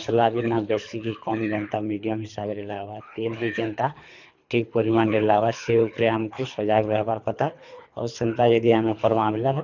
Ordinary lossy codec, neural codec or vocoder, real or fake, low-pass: none; codec, 24 kHz, 3 kbps, HILCodec; fake; 7.2 kHz